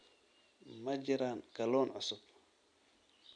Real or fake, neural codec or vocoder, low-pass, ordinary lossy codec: real; none; 9.9 kHz; none